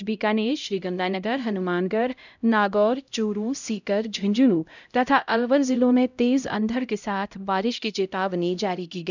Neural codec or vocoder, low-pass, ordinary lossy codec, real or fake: codec, 16 kHz, 0.5 kbps, X-Codec, HuBERT features, trained on LibriSpeech; 7.2 kHz; none; fake